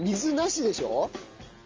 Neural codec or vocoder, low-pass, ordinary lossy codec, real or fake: none; 7.2 kHz; Opus, 32 kbps; real